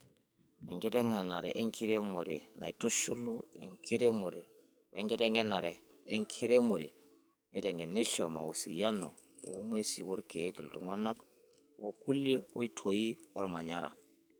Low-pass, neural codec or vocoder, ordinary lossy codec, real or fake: none; codec, 44.1 kHz, 2.6 kbps, SNAC; none; fake